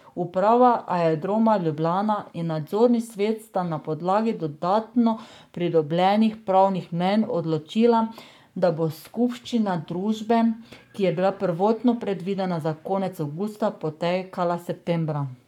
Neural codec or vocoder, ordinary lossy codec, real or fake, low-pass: codec, 44.1 kHz, 7.8 kbps, Pupu-Codec; none; fake; 19.8 kHz